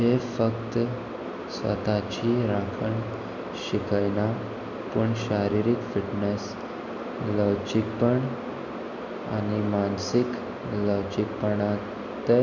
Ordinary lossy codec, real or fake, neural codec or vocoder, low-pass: none; real; none; 7.2 kHz